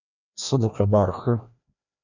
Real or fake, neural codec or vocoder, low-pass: fake; codec, 16 kHz, 1 kbps, FreqCodec, larger model; 7.2 kHz